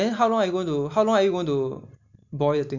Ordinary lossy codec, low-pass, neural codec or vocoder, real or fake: none; 7.2 kHz; none; real